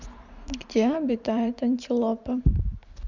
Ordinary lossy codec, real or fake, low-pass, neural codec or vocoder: none; fake; 7.2 kHz; vocoder, 44.1 kHz, 128 mel bands every 512 samples, BigVGAN v2